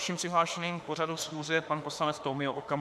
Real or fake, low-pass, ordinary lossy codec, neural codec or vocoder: fake; 14.4 kHz; AAC, 96 kbps; autoencoder, 48 kHz, 32 numbers a frame, DAC-VAE, trained on Japanese speech